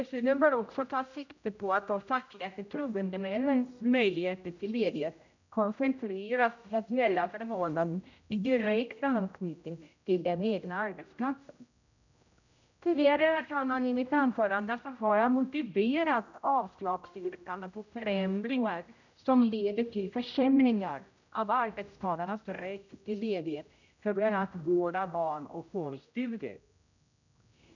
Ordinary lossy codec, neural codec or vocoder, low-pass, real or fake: none; codec, 16 kHz, 0.5 kbps, X-Codec, HuBERT features, trained on general audio; 7.2 kHz; fake